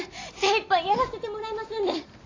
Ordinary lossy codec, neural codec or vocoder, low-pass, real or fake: AAC, 32 kbps; none; 7.2 kHz; real